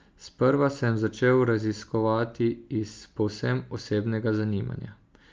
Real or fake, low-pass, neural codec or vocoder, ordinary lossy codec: real; 7.2 kHz; none; Opus, 24 kbps